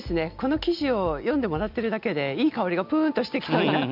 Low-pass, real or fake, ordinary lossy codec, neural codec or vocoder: 5.4 kHz; real; none; none